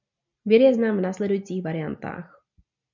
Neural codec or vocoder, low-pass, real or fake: none; 7.2 kHz; real